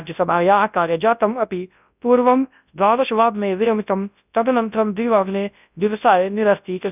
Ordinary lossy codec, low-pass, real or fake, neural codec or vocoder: none; 3.6 kHz; fake; codec, 24 kHz, 0.9 kbps, WavTokenizer, large speech release